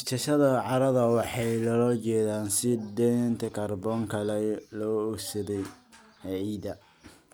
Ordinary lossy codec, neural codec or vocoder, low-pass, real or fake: none; none; none; real